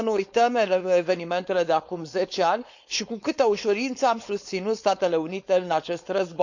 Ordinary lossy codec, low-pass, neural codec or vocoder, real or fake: none; 7.2 kHz; codec, 16 kHz, 4.8 kbps, FACodec; fake